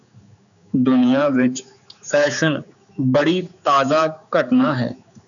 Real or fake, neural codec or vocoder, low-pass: fake; codec, 16 kHz, 4 kbps, X-Codec, HuBERT features, trained on general audio; 7.2 kHz